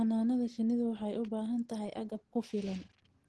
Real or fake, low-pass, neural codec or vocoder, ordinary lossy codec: real; 10.8 kHz; none; Opus, 16 kbps